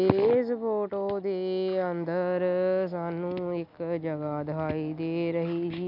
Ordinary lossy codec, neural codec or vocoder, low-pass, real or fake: none; none; 5.4 kHz; real